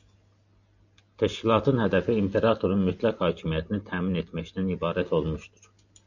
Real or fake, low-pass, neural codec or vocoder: real; 7.2 kHz; none